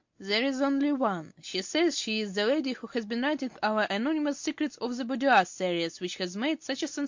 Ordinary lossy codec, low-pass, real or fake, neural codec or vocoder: MP3, 48 kbps; 7.2 kHz; real; none